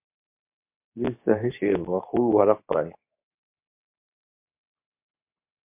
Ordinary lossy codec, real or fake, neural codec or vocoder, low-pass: MP3, 32 kbps; fake; codec, 24 kHz, 0.9 kbps, WavTokenizer, medium speech release version 2; 3.6 kHz